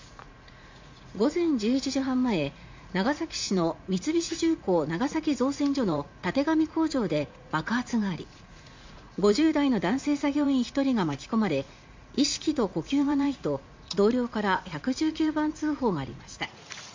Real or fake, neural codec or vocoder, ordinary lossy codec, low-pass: fake; vocoder, 44.1 kHz, 80 mel bands, Vocos; MP3, 64 kbps; 7.2 kHz